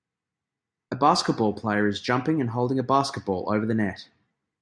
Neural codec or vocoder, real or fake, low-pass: none; real; 9.9 kHz